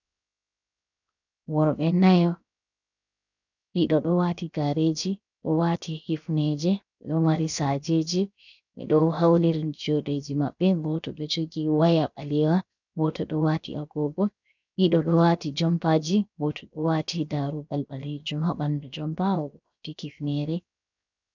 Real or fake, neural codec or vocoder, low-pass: fake; codec, 16 kHz, 0.7 kbps, FocalCodec; 7.2 kHz